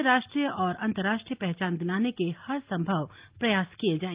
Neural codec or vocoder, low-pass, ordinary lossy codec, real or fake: none; 3.6 kHz; Opus, 32 kbps; real